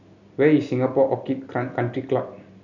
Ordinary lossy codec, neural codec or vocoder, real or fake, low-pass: none; none; real; 7.2 kHz